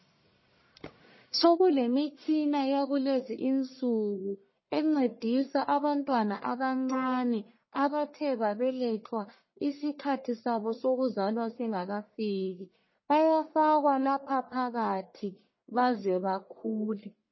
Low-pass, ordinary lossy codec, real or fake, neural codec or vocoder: 7.2 kHz; MP3, 24 kbps; fake; codec, 44.1 kHz, 1.7 kbps, Pupu-Codec